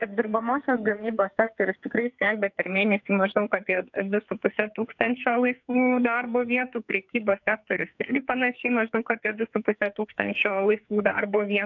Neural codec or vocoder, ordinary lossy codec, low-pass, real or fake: codec, 44.1 kHz, 2.6 kbps, SNAC; AAC, 48 kbps; 7.2 kHz; fake